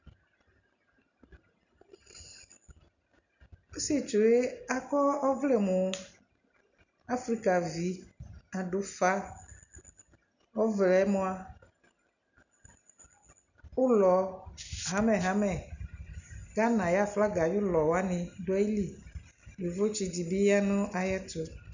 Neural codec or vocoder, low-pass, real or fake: none; 7.2 kHz; real